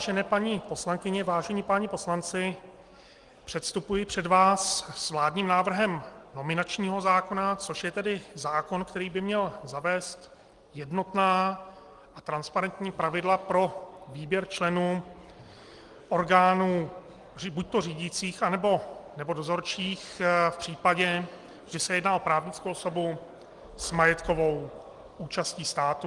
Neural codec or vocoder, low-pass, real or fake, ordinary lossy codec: none; 10.8 kHz; real; Opus, 24 kbps